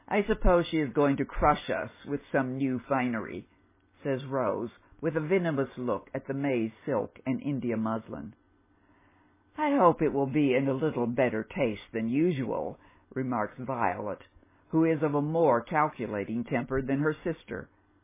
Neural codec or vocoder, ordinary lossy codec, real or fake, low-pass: none; MP3, 16 kbps; real; 3.6 kHz